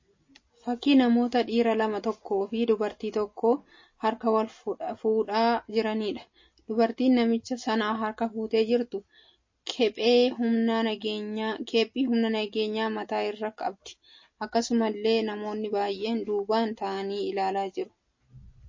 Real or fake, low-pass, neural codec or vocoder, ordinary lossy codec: real; 7.2 kHz; none; MP3, 32 kbps